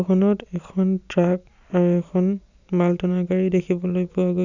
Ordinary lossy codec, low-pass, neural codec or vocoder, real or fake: none; 7.2 kHz; none; real